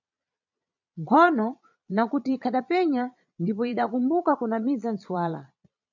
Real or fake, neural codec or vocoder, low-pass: fake; vocoder, 22.05 kHz, 80 mel bands, Vocos; 7.2 kHz